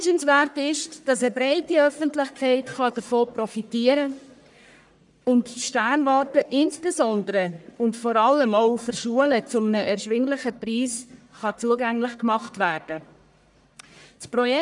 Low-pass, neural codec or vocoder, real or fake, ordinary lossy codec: 10.8 kHz; codec, 44.1 kHz, 1.7 kbps, Pupu-Codec; fake; none